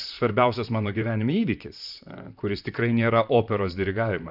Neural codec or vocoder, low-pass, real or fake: vocoder, 44.1 kHz, 128 mel bands, Pupu-Vocoder; 5.4 kHz; fake